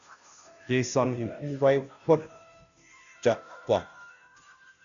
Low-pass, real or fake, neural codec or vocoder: 7.2 kHz; fake; codec, 16 kHz, 0.5 kbps, FunCodec, trained on Chinese and English, 25 frames a second